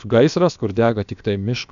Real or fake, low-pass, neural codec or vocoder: fake; 7.2 kHz; codec, 16 kHz, about 1 kbps, DyCAST, with the encoder's durations